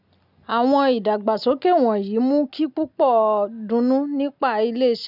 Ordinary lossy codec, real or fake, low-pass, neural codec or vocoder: none; real; 5.4 kHz; none